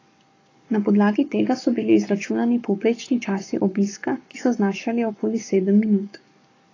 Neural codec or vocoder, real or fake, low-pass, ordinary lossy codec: codec, 44.1 kHz, 7.8 kbps, Pupu-Codec; fake; 7.2 kHz; AAC, 32 kbps